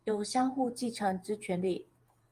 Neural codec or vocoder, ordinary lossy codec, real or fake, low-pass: vocoder, 48 kHz, 128 mel bands, Vocos; Opus, 32 kbps; fake; 14.4 kHz